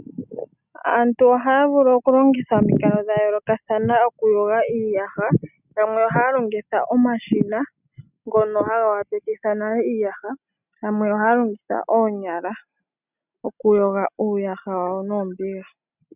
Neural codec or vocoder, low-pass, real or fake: none; 3.6 kHz; real